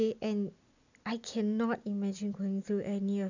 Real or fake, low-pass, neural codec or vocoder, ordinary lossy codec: real; 7.2 kHz; none; none